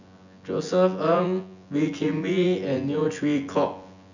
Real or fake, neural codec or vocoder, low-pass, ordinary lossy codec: fake; vocoder, 24 kHz, 100 mel bands, Vocos; 7.2 kHz; none